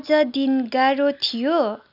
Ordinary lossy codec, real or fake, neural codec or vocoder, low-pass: none; real; none; 5.4 kHz